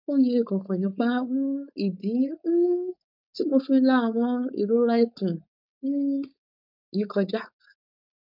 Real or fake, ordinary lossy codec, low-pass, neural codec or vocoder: fake; none; 5.4 kHz; codec, 16 kHz, 4.8 kbps, FACodec